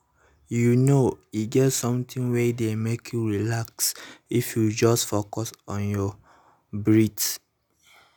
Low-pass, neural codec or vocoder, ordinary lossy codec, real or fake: none; none; none; real